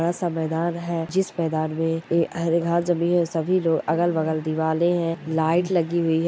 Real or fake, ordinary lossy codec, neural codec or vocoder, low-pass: real; none; none; none